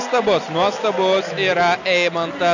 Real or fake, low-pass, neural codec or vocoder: real; 7.2 kHz; none